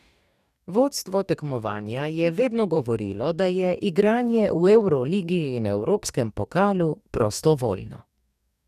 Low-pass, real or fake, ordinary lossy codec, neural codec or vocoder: 14.4 kHz; fake; none; codec, 44.1 kHz, 2.6 kbps, DAC